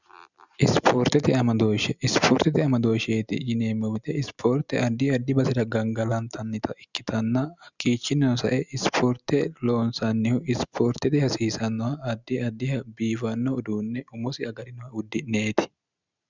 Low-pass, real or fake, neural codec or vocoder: 7.2 kHz; real; none